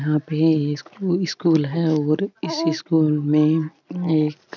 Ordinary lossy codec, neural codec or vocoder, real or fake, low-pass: none; none; real; 7.2 kHz